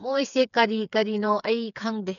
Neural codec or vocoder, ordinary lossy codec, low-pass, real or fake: codec, 16 kHz, 4 kbps, FreqCodec, smaller model; none; 7.2 kHz; fake